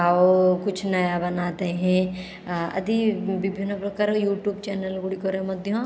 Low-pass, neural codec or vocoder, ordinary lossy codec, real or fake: none; none; none; real